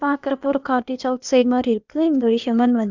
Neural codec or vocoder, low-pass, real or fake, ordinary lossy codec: codec, 16 kHz, 0.8 kbps, ZipCodec; 7.2 kHz; fake; none